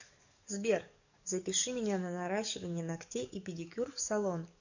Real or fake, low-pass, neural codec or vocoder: fake; 7.2 kHz; codec, 44.1 kHz, 7.8 kbps, DAC